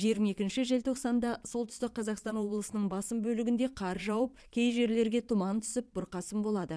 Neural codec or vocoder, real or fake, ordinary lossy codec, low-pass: vocoder, 22.05 kHz, 80 mel bands, Vocos; fake; none; none